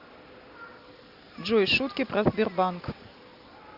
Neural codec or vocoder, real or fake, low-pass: none; real; 5.4 kHz